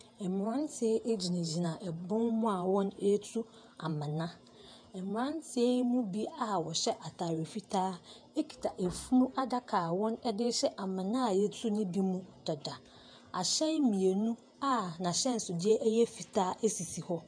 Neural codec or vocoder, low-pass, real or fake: vocoder, 48 kHz, 128 mel bands, Vocos; 9.9 kHz; fake